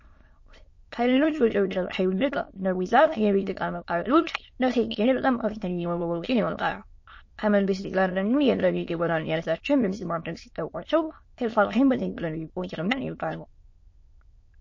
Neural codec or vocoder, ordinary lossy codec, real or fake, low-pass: autoencoder, 22.05 kHz, a latent of 192 numbers a frame, VITS, trained on many speakers; MP3, 32 kbps; fake; 7.2 kHz